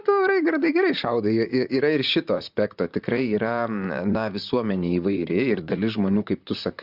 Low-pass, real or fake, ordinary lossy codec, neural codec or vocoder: 5.4 kHz; fake; Opus, 64 kbps; vocoder, 44.1 kHz, 128 mel bands, Pupu-Vocoder